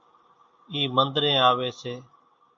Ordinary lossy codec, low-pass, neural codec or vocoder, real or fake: MP3, 48 kbps; 7.2 kHz; none; real